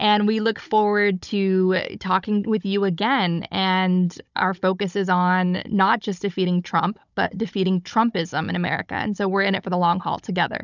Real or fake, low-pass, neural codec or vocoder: fake; 7.2 kHz; codec, 16 kHz, 16 kbps, FunCodec, trained on Chinese and English, 50 frames a second